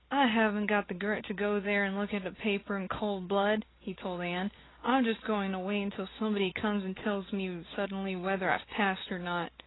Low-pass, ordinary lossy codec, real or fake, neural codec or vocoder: 7.2 kHz; AAC, 16 kbps; real; none